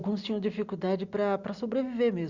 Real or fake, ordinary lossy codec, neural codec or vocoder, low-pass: real; Opus, 64 kbps; none; 7.2 kHz